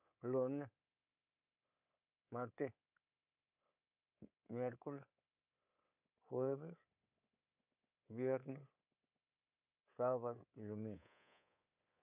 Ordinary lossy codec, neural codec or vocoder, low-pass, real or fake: none; codec, 24 kHz, 3.1 kbps, DualCodec; 3.6 kHz; fake